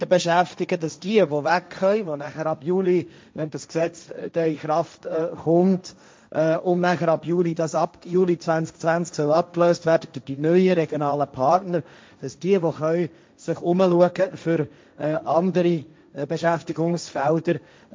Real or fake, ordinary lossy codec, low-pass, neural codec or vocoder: fake; none; none; codec, 16 kHz, 1.1 kbps, Voila-Tokenizer